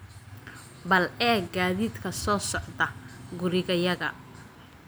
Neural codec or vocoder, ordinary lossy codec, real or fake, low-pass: none; none; real; none